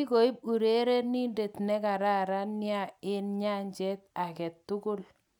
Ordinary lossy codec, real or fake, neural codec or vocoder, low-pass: none; real; none; 19.8 kHz